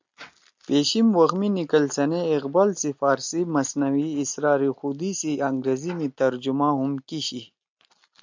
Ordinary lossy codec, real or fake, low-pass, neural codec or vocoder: MP3, 64 kbps; real; 7.2 kHz; none